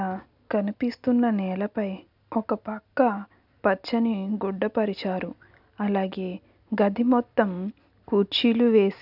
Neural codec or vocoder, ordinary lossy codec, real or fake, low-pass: none; none; real; 5.4 kHz